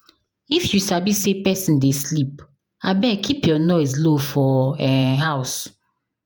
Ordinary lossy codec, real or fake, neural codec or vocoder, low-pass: none; fake; vocoder, 48 kHz, 128 mel bands, Vocos; none